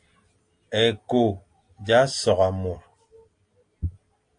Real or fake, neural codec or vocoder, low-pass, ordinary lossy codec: real; none; 9.9 kHz; AAC, 48 kbps